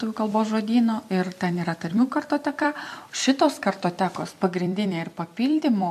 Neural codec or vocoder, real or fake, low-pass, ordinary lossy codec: vocoder, 44.1 kHz, 128 mel bands every 256 samples, BigVGAN v2; fake; 14.4 kHz; MP3, 64 kbps